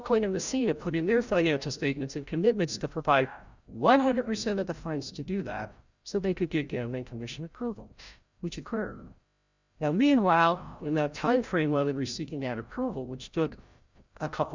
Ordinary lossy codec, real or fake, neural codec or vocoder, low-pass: Opus, 64 kbps; fake; codec, 16 kHz, 0.5 kbps, FreqCodec, larger model; 7.2 kHz